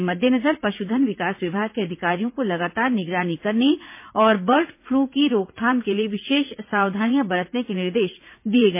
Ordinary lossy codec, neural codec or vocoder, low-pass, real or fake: MP3, 24 kbps; vocoder, 44.1 kHz, 80 mel bands, Vocos; 3.6 kHz; fake